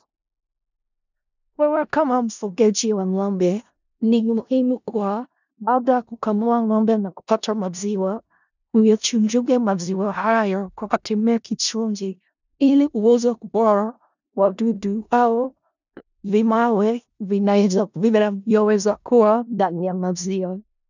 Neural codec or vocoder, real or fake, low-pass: codec, 16 kHz in and 24 kHz out, 0.4 kbps, LongCat-Audio-Codec, four codebook decoder; fake; 7.2 kHz